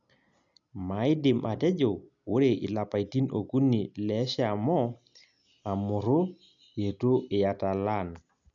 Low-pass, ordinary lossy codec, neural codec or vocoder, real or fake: 7.2 kHz; none; none; real